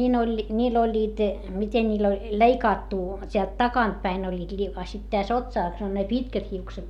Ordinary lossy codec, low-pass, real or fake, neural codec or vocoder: none; 19.8 kHz; real; none